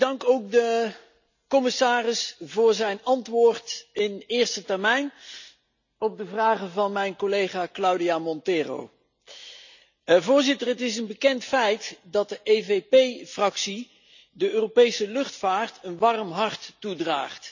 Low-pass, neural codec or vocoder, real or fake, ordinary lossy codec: 7.2 kHz; none; real; none